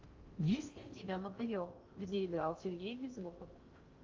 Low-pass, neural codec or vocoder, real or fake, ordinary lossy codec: 7.2 kHz; codec, 16 kHz in and 24 kHz out, 0.6 kbps, FocalCodec, streaming, 4096 codes; fake; Opus, 32 kbps